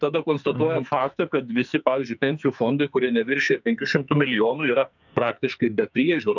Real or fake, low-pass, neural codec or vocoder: fake; 7.2 kHz; codec, 44.1 kHz, 2.6 kbps, SNAC